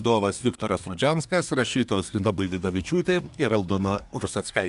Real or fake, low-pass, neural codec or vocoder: fake; 10.8 kHz; codec, 24 kHz, 1 kbps, SNAC